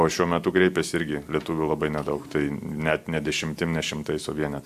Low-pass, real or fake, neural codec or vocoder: 14.4 kHz; real; none